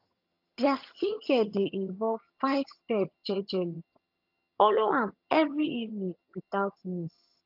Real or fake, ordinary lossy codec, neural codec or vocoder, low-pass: fake; AAC, 48 kbps; vocoder, 22.05 kHz, 80 mel bands, HiFi-GAN; 5.4 kHz